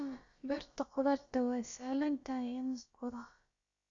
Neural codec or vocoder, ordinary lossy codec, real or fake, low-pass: codec, 16 kHz, about 1 kbps, DyCAST, with the encoder's durations; Opus, 64 kbps; fake; 7.2 kHz